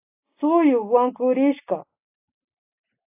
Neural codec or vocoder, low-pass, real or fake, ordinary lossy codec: none; 3.6 kHz; real; AAC, 32 kbps